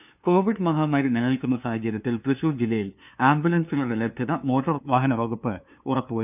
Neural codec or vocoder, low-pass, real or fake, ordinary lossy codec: codec, 16 kHz, 2 kbps, FunCodec, trained on LibriTTS, 25 frames a second; 3.6 kHz; fake; none